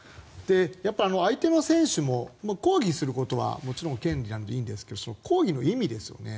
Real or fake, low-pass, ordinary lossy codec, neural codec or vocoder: real; none; none; none